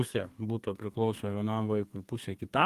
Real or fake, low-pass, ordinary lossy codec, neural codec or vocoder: fake; 14.4 kHz; Opus, 32 kbps; codec, 32 kHz, 1.9 kbps, SNAC